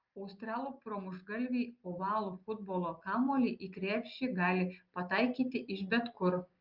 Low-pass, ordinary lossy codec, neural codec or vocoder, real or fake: 5.4 kHz; Opus, 32 kbps; none; real